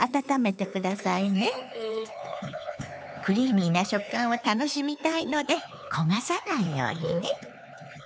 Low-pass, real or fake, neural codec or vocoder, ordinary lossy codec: none; fake; codec, 16 kHz, 4 kbps, X-Codec, HuBERT features, trained on LibriSpeech; none